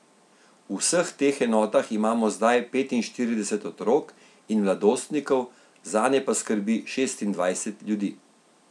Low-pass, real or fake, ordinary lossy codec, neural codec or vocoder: none; real; none; none